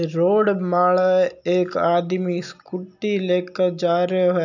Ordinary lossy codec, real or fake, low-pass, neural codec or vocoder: none; real; 7.2 kHz; none